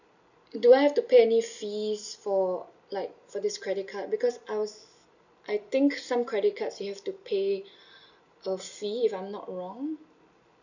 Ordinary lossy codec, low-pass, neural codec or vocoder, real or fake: none; 7.2 kHz; none; real